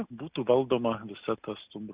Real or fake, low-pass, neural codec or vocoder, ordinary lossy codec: real; 3.6 kHz; none; Opus, 64 kbps